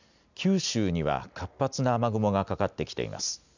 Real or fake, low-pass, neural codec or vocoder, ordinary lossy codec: real; 7.2 kHz; none; none